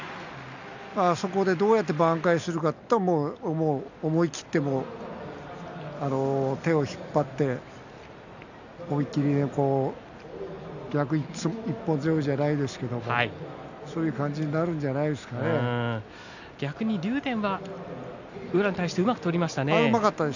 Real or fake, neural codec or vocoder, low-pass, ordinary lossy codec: real; none; 7.2 kHz; none